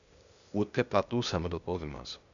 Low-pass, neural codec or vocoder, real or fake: 7.2 kHz; codec, 16 kHz, 0.8 kbps, ZipCodec; fake